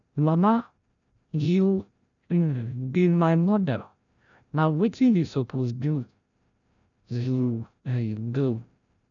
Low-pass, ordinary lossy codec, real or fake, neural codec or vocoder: 7.2 kHz; none; fake; codec, 16 kHz, 0.5 kbps, FreqCodec, larger model